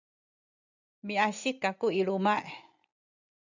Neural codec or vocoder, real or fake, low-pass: none; real; 7.2 kHz